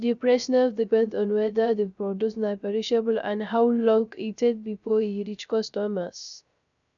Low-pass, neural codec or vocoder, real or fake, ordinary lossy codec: 7.2 kHz; codec, 16 kHz, 0.3 kbps, FocalCodec; fake; none